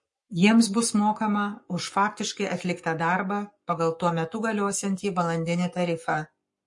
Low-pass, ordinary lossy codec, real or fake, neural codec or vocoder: 10.8 kHz; MP3, 48 kbps; fake; codec, 44.1 kHz, 7.8 kbps, Pupu-Codec